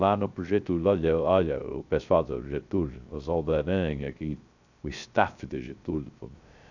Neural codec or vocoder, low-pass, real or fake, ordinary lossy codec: codec, 16 kHz, 0.3 kbps, FocalCodec; 7.2 kHz; fake; none